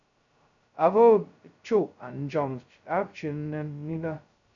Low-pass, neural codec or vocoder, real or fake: 7.2 kHz; codec, 16 kHz, 0.2 kbps, FocalCodec; fake